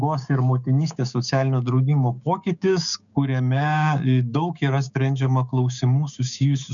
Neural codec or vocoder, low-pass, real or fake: codec, 16 kHz, 6 kbps, DAC; 7.2 kHz; fake